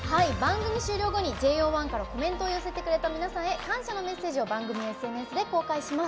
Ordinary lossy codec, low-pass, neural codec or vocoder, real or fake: none; none; none; real